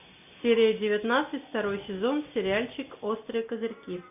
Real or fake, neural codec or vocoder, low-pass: real; none; 3.6 kHz